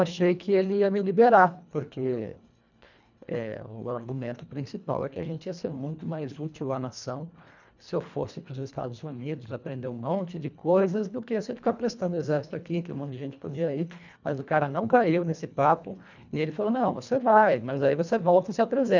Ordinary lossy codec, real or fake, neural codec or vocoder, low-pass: none; fake; codec, 24 kHz, 1.5 kbps, HILCodec; 7.2 kHz